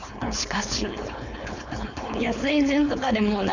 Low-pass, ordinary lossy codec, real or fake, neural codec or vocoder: 7.2 kHz; none; fake; codec, 16 kHz, 4.8 kbps, FACodec